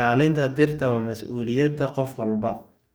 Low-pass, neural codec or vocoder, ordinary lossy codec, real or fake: none; codec, 44.1 kHz, 2.6 kbps, DAC; none; fake